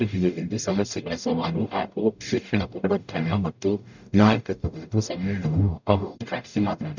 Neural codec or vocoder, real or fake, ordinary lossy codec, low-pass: codec, 44.1 kHz, 0.9 kbps, DAC; fake; none; 7.2 kHz